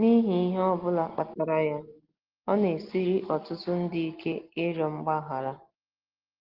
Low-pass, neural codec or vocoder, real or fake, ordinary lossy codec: 5.4 kHz; none; real; Opus, 16 kbps